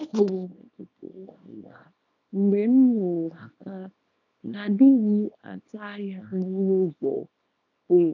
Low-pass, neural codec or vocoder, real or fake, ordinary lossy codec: 7.2 kHz; codec, 24 kHz, 0.9 kbps, WavTokenizer, small release; fake; none